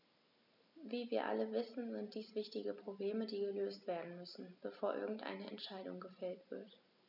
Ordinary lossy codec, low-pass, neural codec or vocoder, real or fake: none; 5.4 kHz; none; real